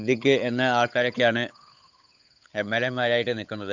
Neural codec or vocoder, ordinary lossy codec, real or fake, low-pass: codec, 16 kHz, 8 kbps, FunCodec, trained on Chinese and English, 25 frames a second; Opus, 64 kbps; fake; 7.2 kHz